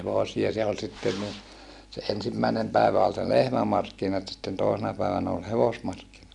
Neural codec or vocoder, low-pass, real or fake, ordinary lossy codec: none; 10.8 kHz; real; MP3, 96 kbps